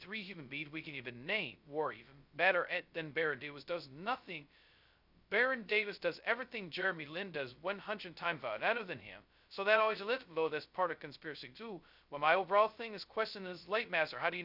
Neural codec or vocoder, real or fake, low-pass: codec, 16 kHz, 0.2 kbps, FocalCodec; fake; 5.4 kHz